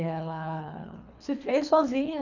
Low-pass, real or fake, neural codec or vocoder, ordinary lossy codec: 7.2 kHz; fake; codec, 24 kHz, 3 kbps, HILCodec; none